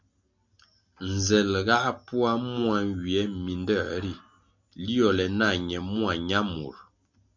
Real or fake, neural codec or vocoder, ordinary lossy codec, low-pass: real; none; MP3, 64 kbps; 7.2 kHz